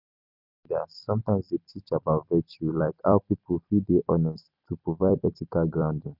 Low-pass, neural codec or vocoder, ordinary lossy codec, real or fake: 5.4 kHz; vocoder, 44.1 kHz, 128 mel bands every 256 samples, BigVGAN v2; none; fake